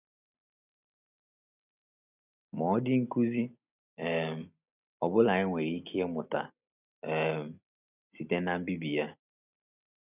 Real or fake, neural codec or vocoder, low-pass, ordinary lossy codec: real; none; 3.6 kHz; none